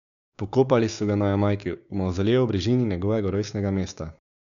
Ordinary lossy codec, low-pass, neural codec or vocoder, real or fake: none; 7.2 kHz; codec, 16 kHz, 6 kbps, DAC; fake